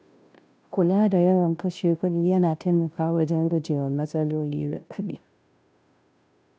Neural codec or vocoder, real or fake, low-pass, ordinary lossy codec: codec, 16 kHz, 0.5 kbps, FunCodec, trained on Chinese and English, 25 frames a second; fake; none; none